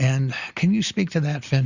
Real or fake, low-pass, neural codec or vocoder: real; 7.2 kHz; none